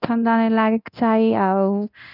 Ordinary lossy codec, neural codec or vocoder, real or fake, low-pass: none; codec, 24 kHz, 0.9 kbps, DualCodec; fake; 5.4 kHz